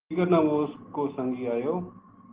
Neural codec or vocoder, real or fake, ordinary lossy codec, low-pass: none; real; Opus, 16 kbps; 3.6 kHz